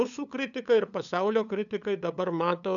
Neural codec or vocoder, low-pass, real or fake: codec, 16 kHz, 8 kbps, FunCodec, trained on LibriTTS, 25 frames a second; 7.2 kHz; fake